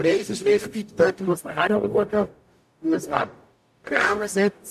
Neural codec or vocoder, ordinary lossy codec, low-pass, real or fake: codec, 44.1 kHz, 0.9 kbps, DAC; MP3, 96 kbps; 14.4 kHz; fake